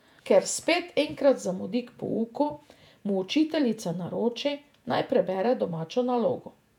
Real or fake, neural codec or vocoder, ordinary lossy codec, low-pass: fake; vocoder, 48 kHz, 128 mel bands, Vocos; none; 19.8 kHz